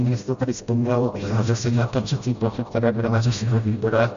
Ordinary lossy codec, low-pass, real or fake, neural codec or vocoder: Opus, 64 kbps; 7.2 kHz; fake; codec, 16 kHz, 0.5 kbps, FreqCodec, smaller model